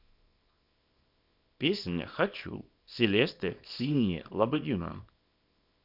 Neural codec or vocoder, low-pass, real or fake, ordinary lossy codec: codec, 24 kHz, 0.9 kbps, WavTokenizer, small release; 5.4 kHz; fake; none